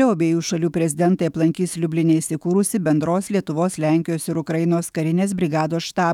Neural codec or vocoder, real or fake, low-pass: autoencoder, 48 kHz, 128 numbers a frame, DAC-VAE, trained on Japanese speech; fake; 19.8 kHz